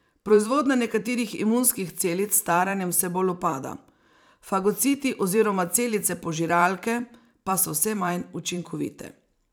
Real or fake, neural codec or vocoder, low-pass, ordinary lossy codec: fake; vocoder, 44.1 kHz, 128 mel bands every 512 samples, BigVGAN v2; none; none